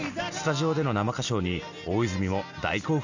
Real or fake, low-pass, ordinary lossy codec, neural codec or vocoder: real; 7.2 kHz; none; none